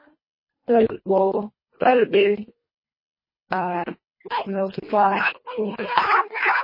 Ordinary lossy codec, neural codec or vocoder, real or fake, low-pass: MP3, 24 kbps; codec, 24 kHz, 1.5 kbps, HILCodec; fake; 5.4 kHz